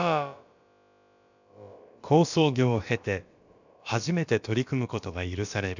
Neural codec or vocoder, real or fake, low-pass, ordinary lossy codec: codec, 16 kHz, about 1 kbps, DyCAST, with the encoder's durations; fake; 7.2 kHz; none